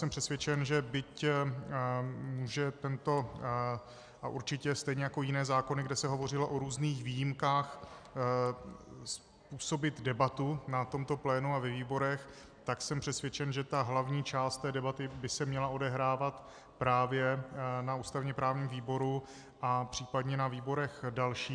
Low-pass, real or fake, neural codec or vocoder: 9.9 kHz; real; none